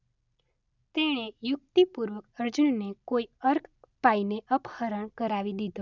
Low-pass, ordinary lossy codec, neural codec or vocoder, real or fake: 7.2 kHz; none; none; real